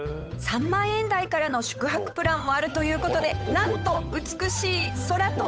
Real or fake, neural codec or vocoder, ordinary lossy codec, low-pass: fake; codec, 16 kHz, 8 kbps, FunCodec, trained on Chinese and English, 25 frames a second; none; none